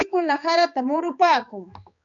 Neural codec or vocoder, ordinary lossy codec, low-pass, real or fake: codec, 16 kHz, 2 kbps, X-Codec, HuBERT features, trained on balanced general audio; AAC, 64 kbps; 7.2 kHz; fake